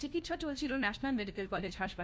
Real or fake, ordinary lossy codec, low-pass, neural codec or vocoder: fake; none; none; codec, 16 kHz, 1 kbps, FunCodec, trained on LibriTTS, 50 frames a second